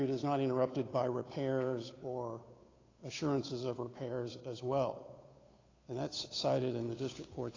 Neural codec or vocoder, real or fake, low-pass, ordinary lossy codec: codec, 16 kHz, 8 kbps, FunCodec, trained on Chinese and English, 25 frames a second; fake; 7.2 kHz; AAC, 32 kbps